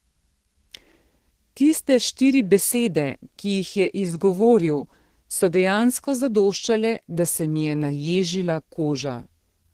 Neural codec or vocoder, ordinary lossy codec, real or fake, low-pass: codec, 32 kHz, 1.9 kbps, SNAC; Opus, 16 kbps; fake; 14.4 kHz